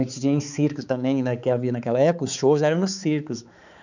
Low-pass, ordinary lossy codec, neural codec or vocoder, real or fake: 7.2 kHz; none; codec, 16 kHz, 4 kbps, X-Codec, HuBERT features, trained on balanced general audio; fake